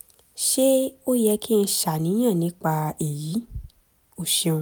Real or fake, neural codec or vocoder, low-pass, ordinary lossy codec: real; none; none; none